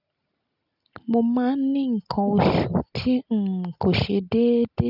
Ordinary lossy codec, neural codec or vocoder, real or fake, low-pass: none; none; real; 5.4 kHz